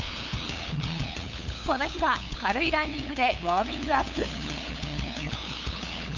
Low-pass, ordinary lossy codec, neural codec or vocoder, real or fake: 7.2 kHz; none; codec, 16 kHz, 8 kbps, FunCodec, trained on LibriTTS, 25 frames a second; fake